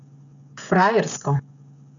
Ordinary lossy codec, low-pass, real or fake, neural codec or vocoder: AAC, 64 kbps; 7.2 kHz; fake; codec, 16 kHz, 16 kbps, FreqCodec, smaller model